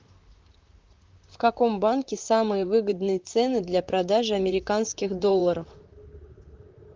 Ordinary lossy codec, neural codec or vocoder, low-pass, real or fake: Opus, 24 kbps; vocoder, 44.1 kHz, 128 mel bands, Pupu-Vocoder; 7.2 kHz; fake